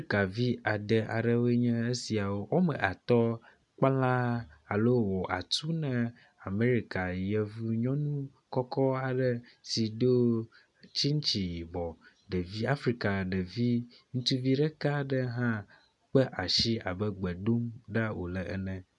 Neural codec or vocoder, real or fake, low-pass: none; real; 10.8 kHz